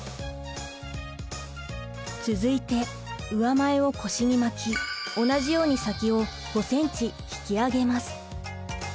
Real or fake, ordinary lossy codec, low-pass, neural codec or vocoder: real; none; none; none